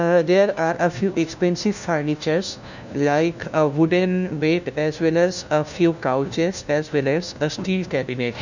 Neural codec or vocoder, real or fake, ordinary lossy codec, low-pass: codec, 16 kHz, 1 kbps, FunCodec, trained on LibriTTS, 50 frames a second; fake; none; 7.2 kHz